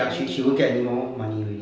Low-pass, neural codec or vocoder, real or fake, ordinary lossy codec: none; none; real; none